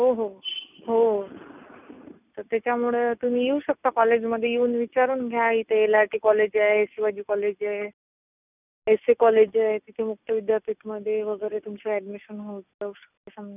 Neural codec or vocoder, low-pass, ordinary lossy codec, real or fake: none; 3.6 kHz; none; real